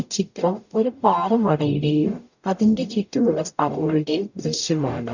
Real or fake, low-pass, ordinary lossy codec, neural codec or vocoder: fake; 7.2 kHz; none; codec, 44.1 kHz, 0.9 kbps, DAC